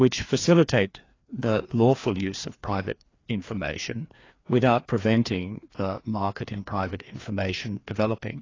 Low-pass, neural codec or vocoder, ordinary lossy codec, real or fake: 7.2 kHz; codec, 16 kHz, 2 kbps, FreqCodec, larger model; AAC, 32 kbps; fake